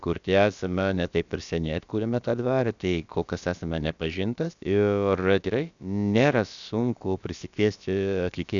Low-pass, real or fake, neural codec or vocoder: 7.2 kHz; fake; codec, 16 kHz, about 1 kbps, DyCAST, with the encoder's durations